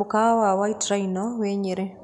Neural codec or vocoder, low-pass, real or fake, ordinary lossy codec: none; 10.8 kHz; real; none